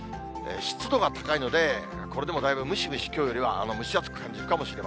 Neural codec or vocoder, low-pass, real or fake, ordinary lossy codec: none; none; real; none